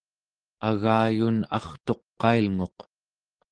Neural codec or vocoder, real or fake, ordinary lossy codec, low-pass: codec, 44.1 kHz, 7.8 kbps, DAC; fake; Opus, 16 kbps; 9.9 kHz